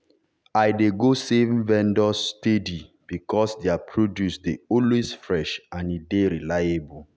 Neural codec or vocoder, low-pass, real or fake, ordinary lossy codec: none; none; real; none